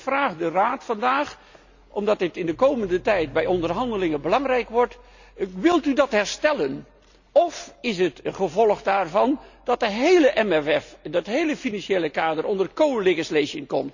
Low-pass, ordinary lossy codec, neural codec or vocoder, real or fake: 7.2 kHz; none; none; real